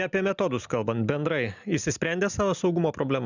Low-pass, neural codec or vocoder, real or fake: 7.2 kHz; none; real